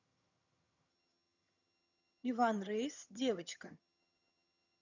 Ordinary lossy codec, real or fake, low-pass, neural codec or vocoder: none; fake; 7.2 kHz; vocoder, 22.05 kHz, 80 mel bands, HiFi-GAN